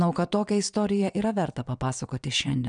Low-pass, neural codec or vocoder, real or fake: 9.9 kHz; vocoder, 22.05 kHz, 80 mel bands, WaveNeXt; fake